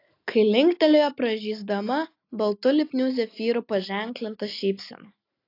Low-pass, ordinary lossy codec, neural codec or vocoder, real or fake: 5.4 kHz; AAC, 32 kbps; none; real